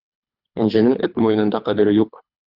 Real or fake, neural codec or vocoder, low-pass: fake; codec, 24 kHz, 3 kbps, HILCodec; 5.4 kHz